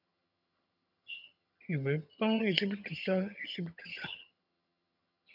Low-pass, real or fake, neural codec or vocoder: 5.4 kHz; fake; vocoder, 22.05 kHz, 80 mel bands, HiFi-GAN